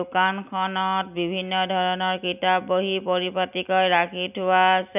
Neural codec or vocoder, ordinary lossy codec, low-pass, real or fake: none; none; 3.6 kHz; real